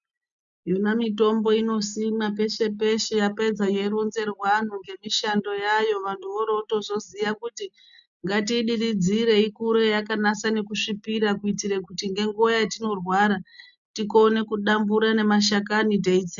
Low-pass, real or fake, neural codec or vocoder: 7.2 kHz; real; none